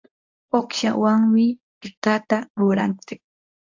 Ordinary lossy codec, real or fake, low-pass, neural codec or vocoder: AAC, 48 kbps; fake; 7.2 kHz; codec, 24 kHz, 0.9 kbps, WavTokenizer, medium speech release version 1